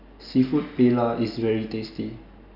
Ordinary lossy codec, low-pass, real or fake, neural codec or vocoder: none; 5.4 kHz; real; none